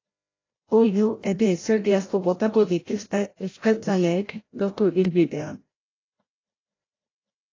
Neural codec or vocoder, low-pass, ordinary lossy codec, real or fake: codec, 16 kHz, 0.5 kbps, FreqCodec, larger model; 7.2 kHz; AAC, 32 kbps; fake